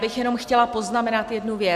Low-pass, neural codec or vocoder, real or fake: 14.4 kHz; none; real